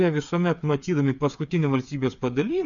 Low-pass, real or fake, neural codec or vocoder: 7.2 kHz; fake; codec, 16 kHz, 8 kbps, FreqCodec, smaller model